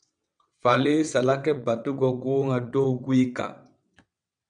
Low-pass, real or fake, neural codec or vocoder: 9.9 kHz; fake; vocoder, 22.05 kHz, 80 mel bands, WaveNeXt